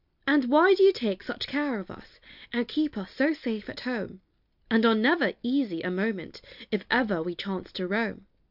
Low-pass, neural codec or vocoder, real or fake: 5.4 kHz; none; real